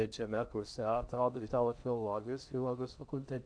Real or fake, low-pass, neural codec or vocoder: fake; 9.9 kHz; codec, 16 kHz in and 24 kHz out, 0.8 kbps, FocalCodec, streaming, 65536 codes